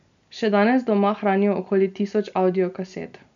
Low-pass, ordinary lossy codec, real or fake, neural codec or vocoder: 7.2 kHz; none; real; none